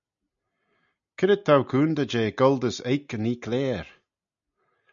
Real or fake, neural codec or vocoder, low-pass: real; none; 7.2 kHz